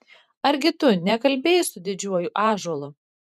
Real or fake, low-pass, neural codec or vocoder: fake; 14.4 kHz; vocoder, 44.1 kHz, 128 mel bands every 512 samples, BigVGAN v2